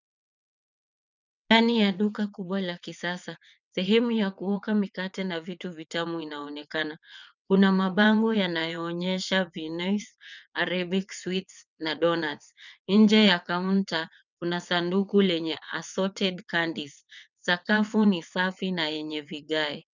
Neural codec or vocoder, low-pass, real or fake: vocoder, 22.05 kHz, 80 mel bands, WaveNeXt; 7.2 kHz; fake